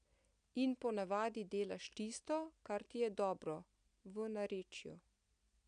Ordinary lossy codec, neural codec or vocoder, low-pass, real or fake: none; none; 9.9 kHz; real